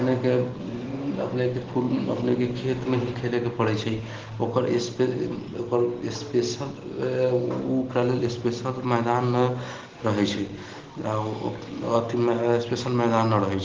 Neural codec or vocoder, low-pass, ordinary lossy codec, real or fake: none; 7.2 kHz; Opus, 16 kbps; real